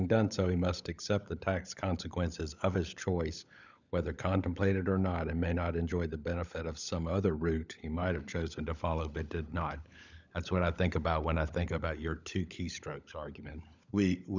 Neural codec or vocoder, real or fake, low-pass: codec, 16 kHz, 16 kbps, FunCodec, trained on LibriTTS, 50 frames a second; fake; 7.2 kHz